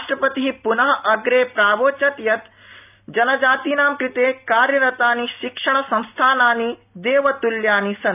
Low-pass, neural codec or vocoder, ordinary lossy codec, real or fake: 3.6 kHz; none; none; real